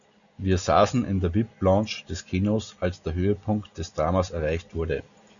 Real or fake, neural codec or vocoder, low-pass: real; none; 7.2 kHz